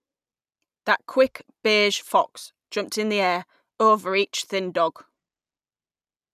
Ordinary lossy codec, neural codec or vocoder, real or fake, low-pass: none; none; real; 14.4 kHz